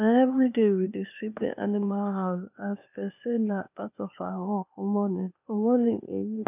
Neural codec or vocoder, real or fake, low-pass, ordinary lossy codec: codec, 16 kHz, 0.8 kbps, ZipCodec; fake; 3.6 kHz; none